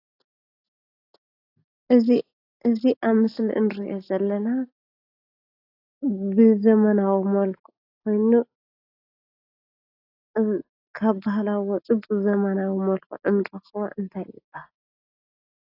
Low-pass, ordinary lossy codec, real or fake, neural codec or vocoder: 5.4 kHz; AAC, 32 kbps; real; none